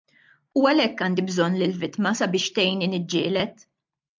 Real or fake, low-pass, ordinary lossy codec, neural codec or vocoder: real; 7.2 kHz; MP3, 64 kbps; none